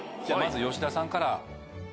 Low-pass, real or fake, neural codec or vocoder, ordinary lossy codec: none; real; none; none